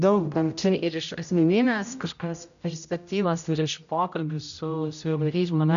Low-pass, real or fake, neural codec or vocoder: 7.2 kHz; fake; codec, 16 kHz, 0.5 kbps, X-Codec, HuBERT features, trained on general audio